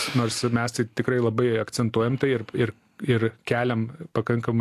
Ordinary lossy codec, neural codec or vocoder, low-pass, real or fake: AAC, 64 kbps; none; 14.4 kHz; real